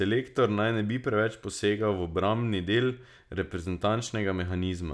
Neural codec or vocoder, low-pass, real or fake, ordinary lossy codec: none; none; real; none